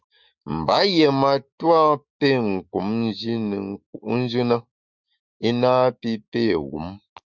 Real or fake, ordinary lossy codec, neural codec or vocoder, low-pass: fake; Opus, 64 kbps; autoencoder, 48 kHz, 128 numbers a frame, DAC-VAE, trained on Japanese speech; 7.2 kHz